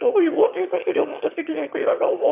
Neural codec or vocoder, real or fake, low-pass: autoencoder, 22.05 kHz, a latent of 192 numbers a frame, VITS, trained on one speaker; fake; 3.6 kHz